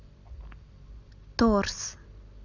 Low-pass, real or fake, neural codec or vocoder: 7.2 kHz; real; none